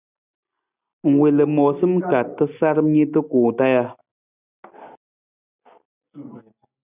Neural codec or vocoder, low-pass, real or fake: none; 3.6 kHz; real